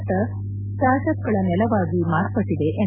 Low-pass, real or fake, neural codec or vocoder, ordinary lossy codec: 3.6 kHz; real; none; none